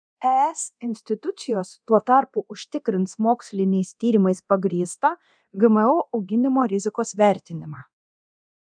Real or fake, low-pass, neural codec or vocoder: fake; 9.9 kHz; codec, 24 kHz, 0.9 kbps, DualCodec